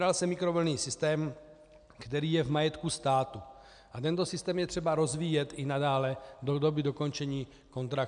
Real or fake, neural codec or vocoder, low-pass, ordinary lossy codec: real; none; 9.9 kHz; MP3, 96 kbps